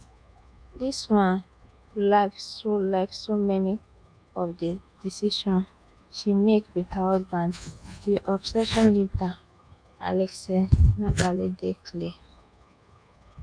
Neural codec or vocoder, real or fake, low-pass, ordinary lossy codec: codec, 24 kHz, 1.2 kbps, DualCodec; fake; 9.9 kHz; none